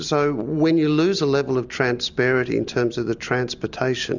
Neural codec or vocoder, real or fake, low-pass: none; real; 7.2 kHz